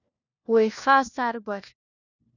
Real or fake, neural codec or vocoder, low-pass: fake; codec, 16 kHz, 1 kbps, FunCodec, trained on LibriTTS, 50 frames a second; 7.2 kHz